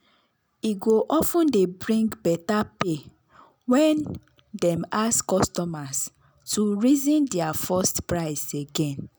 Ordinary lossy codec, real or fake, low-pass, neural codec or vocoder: none; real; none; none